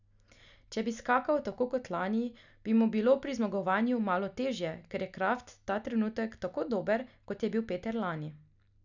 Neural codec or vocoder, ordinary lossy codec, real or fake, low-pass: none; none; real; 7.2 kHz